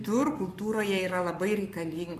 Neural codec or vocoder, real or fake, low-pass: codec, 44.1 kHz, 7.8 kbps, Pupu-Codec; fake; 14.4 kHz